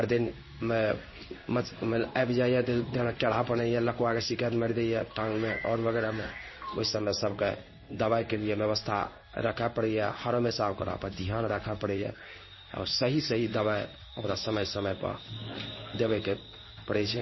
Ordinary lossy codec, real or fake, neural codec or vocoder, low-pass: MP3, 24 kbps; fake; codec, 16 kHz in and 24 kHz out, 1 kbps, XY-Tokenizer; 7.2 kHz